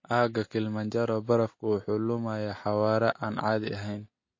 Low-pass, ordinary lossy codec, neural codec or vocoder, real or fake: 7.2 kHz; MP3, 32 kbps; none; real